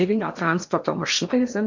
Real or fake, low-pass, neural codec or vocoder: fake; 7.2 kHz; codec, 16 kHz in and 24 kHz out, 0.8 kbps, FocalCodec, streaming, 65536 codes